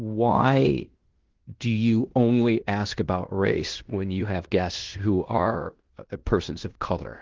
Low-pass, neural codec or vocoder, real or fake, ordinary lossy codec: 7.2 kHz; codec, 16 kHz in and 24 kHz out, 0.9 kbps, LongCat-Audio-Codec, fine tuned four codebook decoder; fake; Opus, 16 kbps